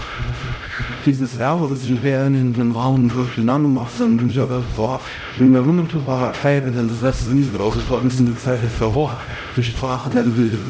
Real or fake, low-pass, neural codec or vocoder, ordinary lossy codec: fake; none; codec, 16 kHz, 0.5 kbps, X-Codec, HuBERT features, trained on LibriSpeech; none